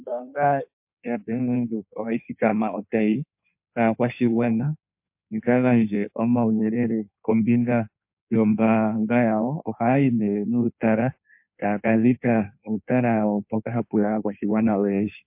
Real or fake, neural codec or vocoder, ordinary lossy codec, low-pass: fake; codec, 16 kHz in and 24 kHz out, 1.1 kbps, FireRedTTS-2 codec; MP3, 32 kbps; 3.6 kHz